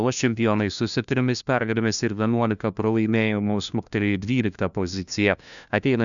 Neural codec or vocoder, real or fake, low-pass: codec, 16 kHz, 1 kbps, FunCodec, trained on LibriTTS, 50 frames a second; fake; 7.2 kHz